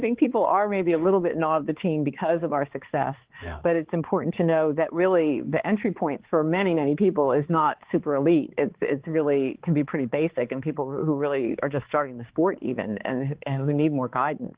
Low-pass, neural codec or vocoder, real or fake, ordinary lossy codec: 3.6 kHz; codec, 16 kHz, 4 kbps, X-Codec, HuBERT features, trained on balanced general audio; fake; Opus, 16 kbps